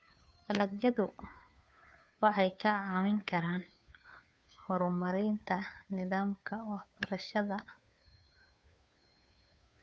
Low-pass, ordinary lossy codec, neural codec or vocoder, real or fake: none; none; codec, 16 kHz, 2 kbps, FunCodec, trained on Chinese and English, 25 frames a second; fake